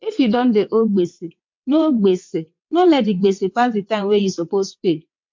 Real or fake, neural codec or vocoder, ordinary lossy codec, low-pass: fake; vocoder, 22.05 kHz, 80 mel bands, WaveNeXt; MP3, 48 kbps; 7.2 kHz